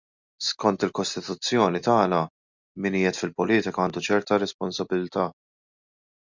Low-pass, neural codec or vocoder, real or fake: 7.2 kHz; none; real